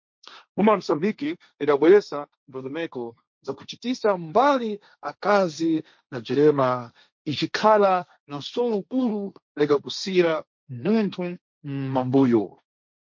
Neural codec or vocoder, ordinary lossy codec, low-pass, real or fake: codec, 16 kHz, 1.1 kbps, Voila-Tokenizer; MP3, 48 kbps; 7.2 kHz; fake